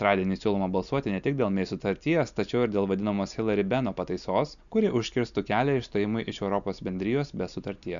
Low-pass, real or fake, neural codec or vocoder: 7.2 kHz; real; none